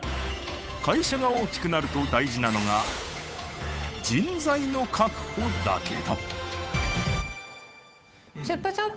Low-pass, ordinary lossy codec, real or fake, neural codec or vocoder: none; none; fake; codec, 16 kHz, 8 kbps, FunCodec, trained on Chinese and English, 25 frames a second